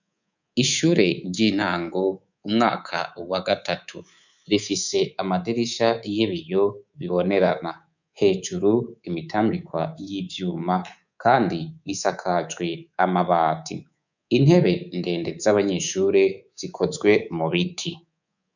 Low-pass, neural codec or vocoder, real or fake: 7.2 kHz; codec, 24 kHz, 3.1 kbps, DualCodec; fake